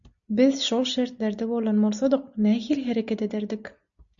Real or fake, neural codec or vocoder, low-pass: real; none; 7.2 kHz